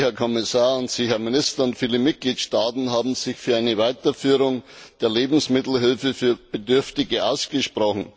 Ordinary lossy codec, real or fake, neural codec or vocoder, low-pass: none; real; none; none